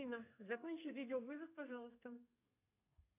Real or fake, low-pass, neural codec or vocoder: fake; 3.6 kHz; codec, 32 kHz, 1.9 kbps, SNAC